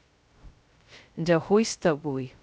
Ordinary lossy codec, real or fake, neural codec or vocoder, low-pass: none; fake; codec, 16 kHz, 0.2 kbps, FocalCodec; none